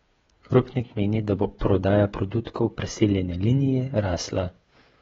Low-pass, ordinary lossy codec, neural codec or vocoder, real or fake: 7.2 kHz; AAC, 24 kbps; codec, 16 kHz, 16 kbps, FreqCodec, smaller model; fake